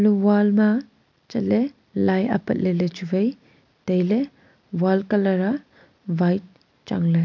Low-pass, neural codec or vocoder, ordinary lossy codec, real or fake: 7.2 kHz; none; MP3, 64 kbps; real